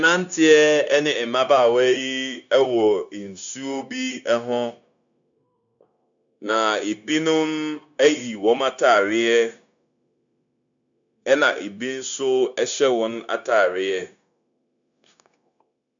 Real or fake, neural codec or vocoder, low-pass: fake; codec, 16 kHz, 0.9 kbps, LongCat-Audio-Codec; 7.2 kHz